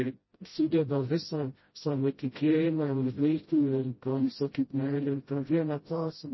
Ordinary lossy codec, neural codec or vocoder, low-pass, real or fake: MP3, 24 kbps; codec, 16 kHz, 0.5 kbps, FreqCodec, smaller model; 7.2 kHz; fake